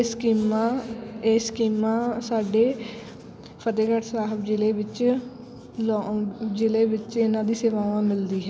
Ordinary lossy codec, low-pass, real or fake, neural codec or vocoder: none; none; real; none